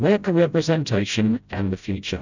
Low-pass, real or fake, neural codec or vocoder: 7.2 kHz; fake; codec, 16 kHz, 0.5 kbps, FreqCodec, smaller model